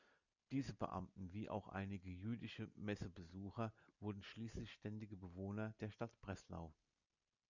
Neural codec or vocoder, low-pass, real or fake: none; 7.2 kHz; real